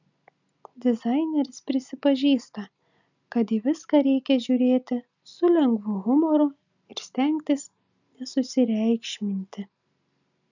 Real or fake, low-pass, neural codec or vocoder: real; 7.2 kHz; none